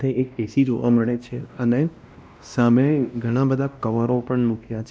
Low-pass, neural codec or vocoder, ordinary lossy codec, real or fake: none; codec, 16 kHz, 1 kbps, X-Codec, WavLM features, trained on Multilingual LibriSpeech; none; fake